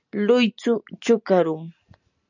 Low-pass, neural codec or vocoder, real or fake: 7.2 kHz; none; real